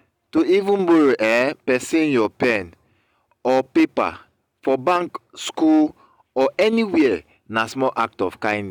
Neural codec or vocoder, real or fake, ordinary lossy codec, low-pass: none; real; none; 19.8 kHz